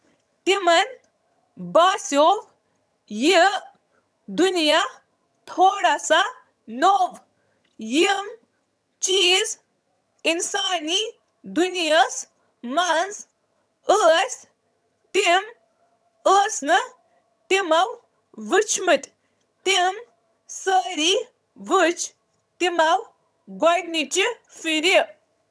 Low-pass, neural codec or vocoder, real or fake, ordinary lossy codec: none; vocoder, 22.05 kHz, 80 mel bands, HiFi-GAN; fake; none